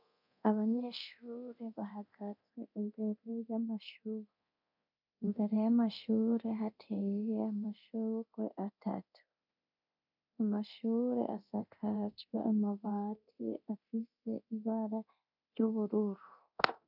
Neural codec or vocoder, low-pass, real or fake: codec, 24 kHz, 0.9 kbps, DualCodec; 5.4 kHz; fake